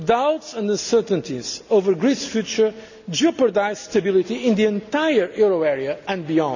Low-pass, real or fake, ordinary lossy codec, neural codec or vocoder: 7.2 kHz; real; none; none